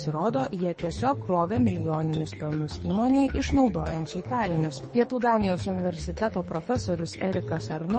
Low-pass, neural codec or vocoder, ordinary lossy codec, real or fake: 10.8 kHz; codec, 24 kHz, 3 kbps, HILCodec; MP3, 32 kbps; fake